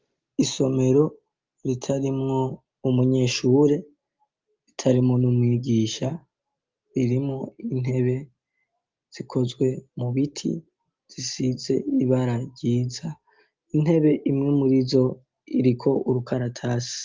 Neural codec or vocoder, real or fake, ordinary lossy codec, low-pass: none; real; Opus, 32 kbps; 7.2 kHz